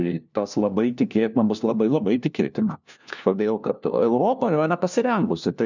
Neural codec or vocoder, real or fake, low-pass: codec, 16 kHz, 1 kbps, FunCodec, trained on LibriTTS, 50 frames a second; fake; 7.2 kHz